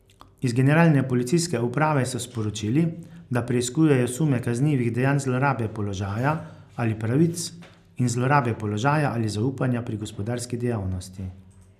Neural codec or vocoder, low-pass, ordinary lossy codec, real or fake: none; 14.4 kHz; none; real